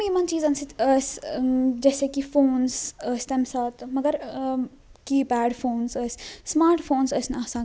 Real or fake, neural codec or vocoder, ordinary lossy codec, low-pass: real; none; none; none